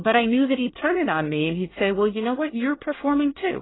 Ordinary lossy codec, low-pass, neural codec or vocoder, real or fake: AAC, 16 kbps; 7.2 kHz; codec, 24 kHz, 1 kbps, SNAC; fake